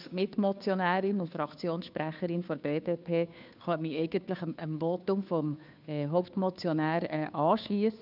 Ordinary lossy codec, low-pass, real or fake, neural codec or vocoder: none; 5.4 kHz; fake; codec, 16 kHz, 2 kbps, FunCodec, trained on Chinese and English, 25 frames a second